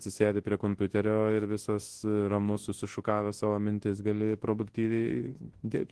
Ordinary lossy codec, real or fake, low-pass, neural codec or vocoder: Opus, 16 kbps; fake; 10.8 kHz; codec, 24 kHz, 0.9 kbps, WavTokenizer, large speech release